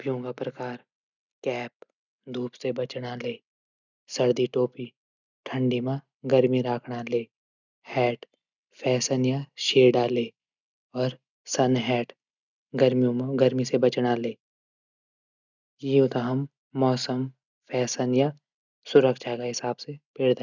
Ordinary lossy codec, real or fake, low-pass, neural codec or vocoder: none; real; 7.2 kHz; none